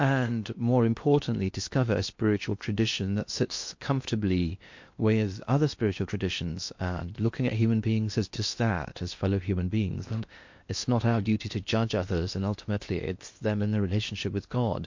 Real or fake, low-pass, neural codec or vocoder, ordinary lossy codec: fake; 7.2 kHz; codec, 16 kHz in and 24 kHz out, 0.8 kbps, FocalCodec, streaming, 65536 codes; MP3, 48 kbps